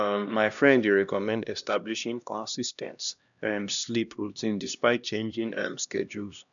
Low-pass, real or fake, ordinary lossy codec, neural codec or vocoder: 7.2 kHz; fake; none; codec, 16 kHz, 1 kbps, X-Codec, HuBERT features, trained on LibriSpeech